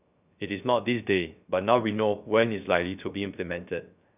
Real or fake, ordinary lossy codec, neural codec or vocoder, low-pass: fake; none; codec, 16 kHz, 0.3 kbps, FocalCodec; 3.6 kHz